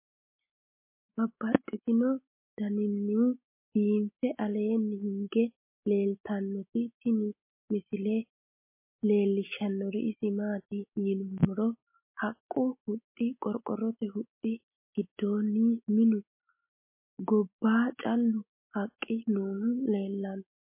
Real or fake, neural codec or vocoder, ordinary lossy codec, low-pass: real; none; MP3, 24 kbps; 3.6 kHz